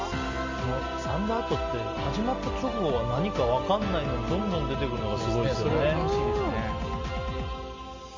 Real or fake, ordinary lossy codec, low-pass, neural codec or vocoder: real; MP3, 48 kbps; 7.2 kHz; none